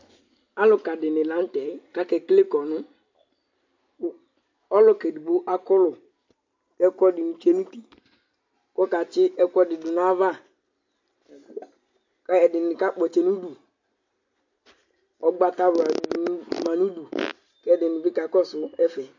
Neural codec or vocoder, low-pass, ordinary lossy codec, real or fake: none; 7.2 kHz; MP3, 48 kbps; real